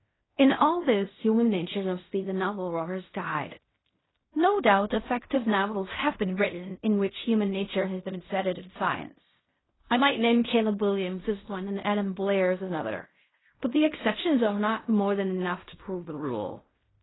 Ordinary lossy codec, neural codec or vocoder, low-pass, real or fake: AAC, 16 kbps; codec, 16 kHz in and 24 kHz out, 0.4 kbps, LongCat-Audio-Codec, fine tuned four codebook decoder; 7.2 kHz; fake